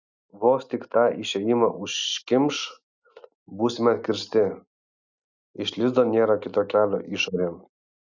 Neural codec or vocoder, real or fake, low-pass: none; real; 7.2 kHz